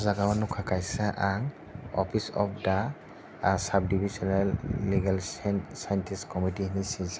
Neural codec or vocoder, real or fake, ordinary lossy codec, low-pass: none; real; none; none